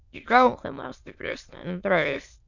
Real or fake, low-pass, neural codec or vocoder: fake; 7.2 kHz; autoencoder, 22.05 kHz, a latent of 192 numbers a frame, VITS, trained on many speakers